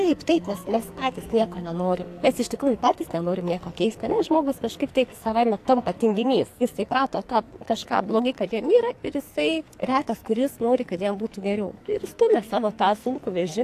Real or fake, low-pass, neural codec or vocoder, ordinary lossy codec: fake; 14.4 kHz; codec, 44.1 kHz, 2.6 kbps, SNAC; AAC, 64 kbps